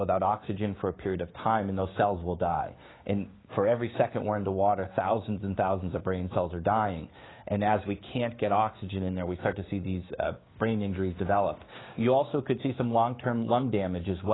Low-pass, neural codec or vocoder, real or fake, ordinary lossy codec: 7.2 kHz; codec, 16 kHz, 6 kbps, DAC; fake; AAC, 16 kbps